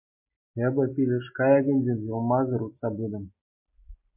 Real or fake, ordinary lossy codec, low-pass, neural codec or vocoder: real; MP3, 24 kbps; 3.6 kHz; none